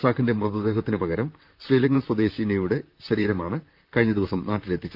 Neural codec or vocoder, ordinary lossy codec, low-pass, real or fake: vocoder, 44.1 kHz, 128 mel bands, Pupu-Vocoder; Opus, 32 kbps; 5.4 kHz; fake